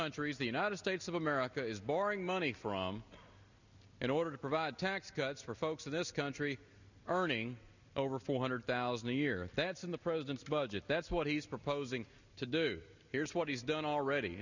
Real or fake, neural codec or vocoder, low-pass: real; none; 7.2 kHz